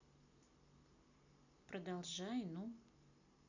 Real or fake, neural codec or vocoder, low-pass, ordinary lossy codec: real; none; 7.2 kHz; none